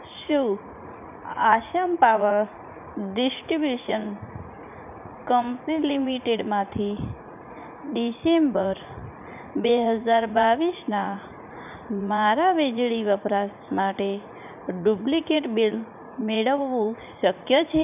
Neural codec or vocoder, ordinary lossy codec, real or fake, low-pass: vocoder, 44.1 kHz, 80 mel bands, Vocos; none; fake; 3.6 kHz